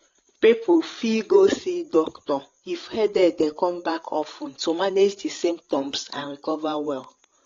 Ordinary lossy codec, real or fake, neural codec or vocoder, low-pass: AAC, 32 kbps; fake; codec, 16 kHz, 8 kbps, FreqCodec, larger model; 7.2 kHz